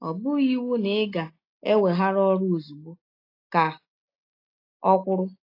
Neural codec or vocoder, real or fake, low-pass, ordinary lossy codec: none; real; 5.4 kHz; AAC, 32 kbps